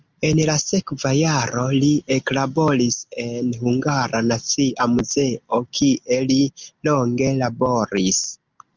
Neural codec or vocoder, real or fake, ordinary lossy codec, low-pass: none; real; Opus, 32 kbps; 7.2 kHz